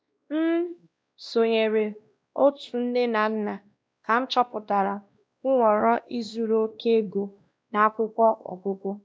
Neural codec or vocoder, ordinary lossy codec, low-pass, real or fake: codec, 16 kHz, 1 kbps, X-Codec, WavLM features, trained on Multilingual LibriSpeech; none; none; fake